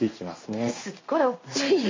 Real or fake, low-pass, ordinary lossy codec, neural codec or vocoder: fake; 7.2 kHz; MP3, 32 kbps; codec, 16 kHz in and 24 kHz out, 1 kbps, XY-Tokenizer